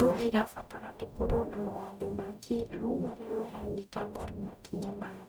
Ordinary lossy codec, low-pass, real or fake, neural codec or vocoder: none; none; fake; codec, 44.1 kHz, 0.9 kbps, DAC